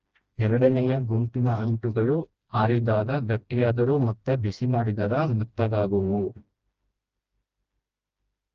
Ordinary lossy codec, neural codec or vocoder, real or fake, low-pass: Opus, 24 kbps; codec, 16 kHz, 1 kbps, FreqCodec, smaller model; fake; 7.2 kHz